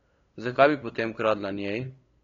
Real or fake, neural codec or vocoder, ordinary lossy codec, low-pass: fake; codec, 16 kHz, 8 kbps, FunCodec, trained on LibriTTS, 25 frames a second; AAC, 32 kbps; 7.2 kHz